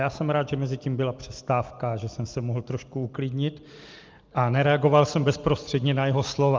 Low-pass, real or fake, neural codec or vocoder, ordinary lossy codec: 7.2 kHz; real; none; Opus, 24 kbps